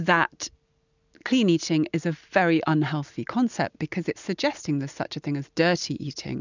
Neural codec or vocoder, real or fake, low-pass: none; real; 7.2 kHz